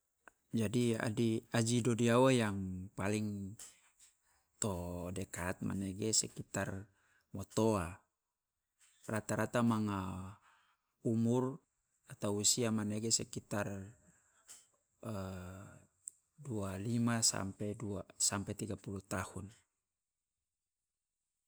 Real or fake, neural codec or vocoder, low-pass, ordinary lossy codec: real; none; none; none